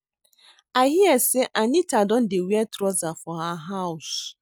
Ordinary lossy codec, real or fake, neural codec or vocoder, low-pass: none; real; none; none